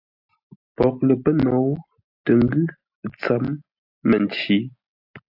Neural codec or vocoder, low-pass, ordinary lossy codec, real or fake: none; 5.4 kHz; AAC, 48 kbps; real